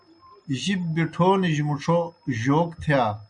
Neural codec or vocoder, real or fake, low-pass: none; real; 9.9 kHz